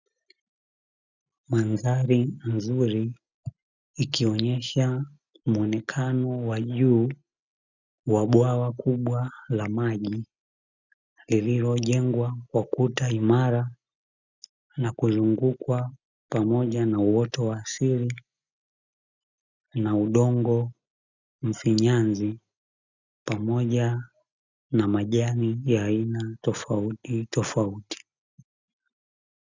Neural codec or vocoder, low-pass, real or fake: none; 7.2 kHz; real